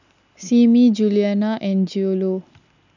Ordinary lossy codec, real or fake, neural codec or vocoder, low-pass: none; real; none; 7.2 kHz